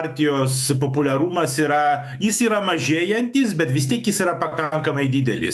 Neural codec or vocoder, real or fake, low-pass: autoencoder, 48 kHz, 128 numbers a frame, DAC-VAE, trained on Japanese speech; fake; 14.4 kHz